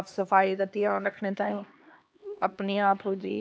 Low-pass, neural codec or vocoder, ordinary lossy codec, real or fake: none; codec, 16 kHz, 2 kbps, X-Codec, HuBERT features, trained on LibriSpeech; none; fake